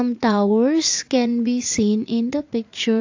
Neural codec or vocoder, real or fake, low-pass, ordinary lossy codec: vocoder, 44.1 kHz, 80 mel bands, Vocos; fake; 7.2 kHz; AAC, 48 kbps